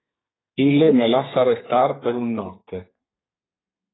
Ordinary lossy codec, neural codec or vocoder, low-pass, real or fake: AAC, 16 kbps; codec, 32 kHz, 1.9 kbps, SNAC; 7.2 kHz; fake